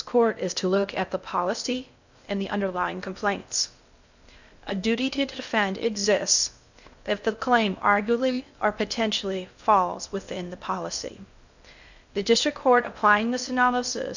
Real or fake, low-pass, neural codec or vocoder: fake; 7.2 kHz; codec, 16 kHz in and 24 kHz out, 0.6 kbps, FocalCodec, streaming, 2048 codes